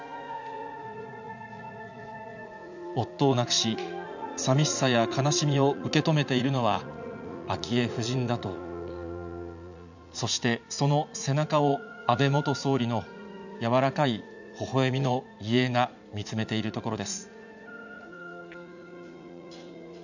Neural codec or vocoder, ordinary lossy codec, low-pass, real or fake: vocoder, 44.1 kHz, 80 mel bands, Vocos; none; 7.2 kHz; fake